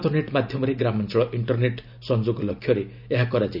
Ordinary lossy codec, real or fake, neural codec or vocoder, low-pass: none; real; none; 5.4 kHz